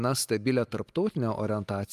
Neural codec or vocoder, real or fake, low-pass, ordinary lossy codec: none; real; 14.4 kHz; Opus, 32 kbps